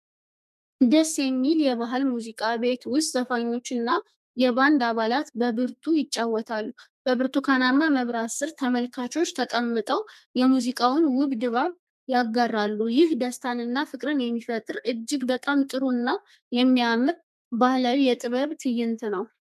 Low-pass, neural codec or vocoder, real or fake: 14.4 kHz; codec, 32 kHz, 1.9 kbps, SNAC; fake